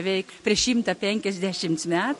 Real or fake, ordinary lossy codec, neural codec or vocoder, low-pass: real; MP3, 48 kbps; none; 14.4 kHz